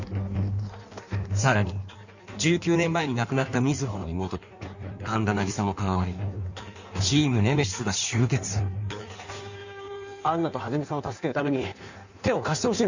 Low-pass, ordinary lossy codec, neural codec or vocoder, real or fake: 7.2 kHz; none; codec, 16 kHz in and 24 kHz out, 1.1 kbps, FireRedTTS-2 codec; fake